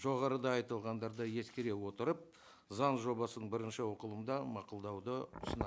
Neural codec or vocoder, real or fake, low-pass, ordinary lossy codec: none; real; none; none